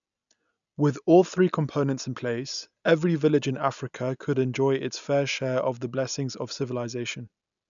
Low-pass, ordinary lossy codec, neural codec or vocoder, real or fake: 7.2 kHz; none; none; real